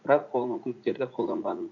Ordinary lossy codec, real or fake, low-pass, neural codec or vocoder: none; fake; 7.2 kHz; vocoder, 44.1 kHz, 80 mel bands, Vocos